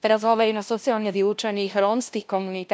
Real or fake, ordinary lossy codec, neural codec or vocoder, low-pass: fake; none; codec, 16 kHz, 0.5 kbps, FunCodec, trained on LibriTTS, 25 frames a second; none